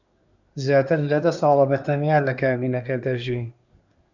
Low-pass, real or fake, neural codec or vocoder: 7.2 kHz; fake; codec, 24 kHz, 1 kbps, SNAC